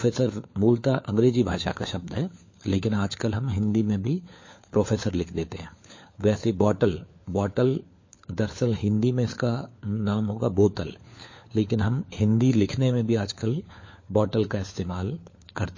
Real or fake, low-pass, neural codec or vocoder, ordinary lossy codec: fake; 7.2 kHz; codec, 16 kHz, 4 kbps, FunCodec, trained on LibriTTS, 50 frames a second; MP3, 32 kbps